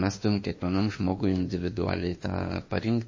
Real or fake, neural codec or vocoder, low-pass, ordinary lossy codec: fake; autoencoder, 48 kHz, 32 numbers a frame, DAC-VAE, trained on Japanese speech; 7.2 kHz; MP3, 32 kbps